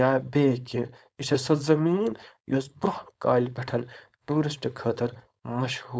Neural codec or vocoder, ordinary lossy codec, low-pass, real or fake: codec, 16 kHz, 4.8 kbps, FACodec; none; none; fake